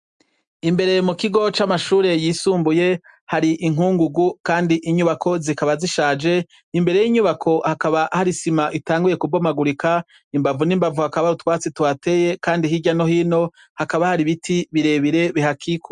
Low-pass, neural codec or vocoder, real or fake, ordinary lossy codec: 10.8 kHz; none; real; MP3, 96 kbps